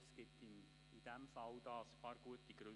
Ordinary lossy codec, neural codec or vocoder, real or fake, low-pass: none; none; real; 10.8 kHz